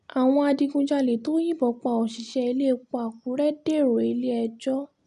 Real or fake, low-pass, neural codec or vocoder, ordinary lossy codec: real; 10.8 kHz; none; none